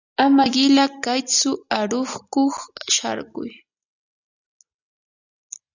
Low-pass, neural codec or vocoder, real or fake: 7.2 kHz; none; real